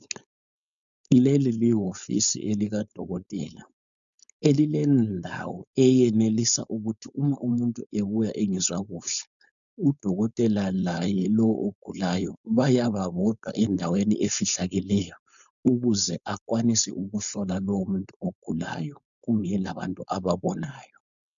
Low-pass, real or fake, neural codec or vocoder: 7.2 kHz; fake; codec, 16 kHz, 4.8 kbps, FACodec